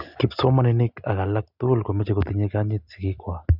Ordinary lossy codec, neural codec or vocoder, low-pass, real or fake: none; none; 5.4 kHz; real